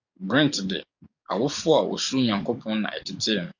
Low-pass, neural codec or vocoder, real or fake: 7.2 kHz; codec, 44.1 kHz, 7.8 kbps, DAC; fake